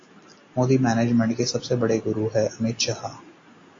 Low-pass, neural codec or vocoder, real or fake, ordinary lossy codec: 7.2 kHz; none; real; AAC, 32 kbps